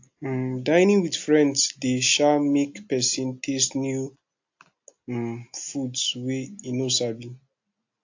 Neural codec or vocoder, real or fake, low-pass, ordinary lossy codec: none; real; 7.2 kHz; AAC, 48 kbps